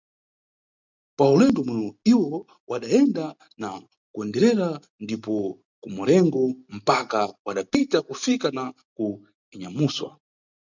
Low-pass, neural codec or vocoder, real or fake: 7.2 kHz; none; real